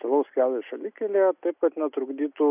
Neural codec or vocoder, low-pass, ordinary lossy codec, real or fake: none; 3.6 kHz; AAC, 32 kbps; real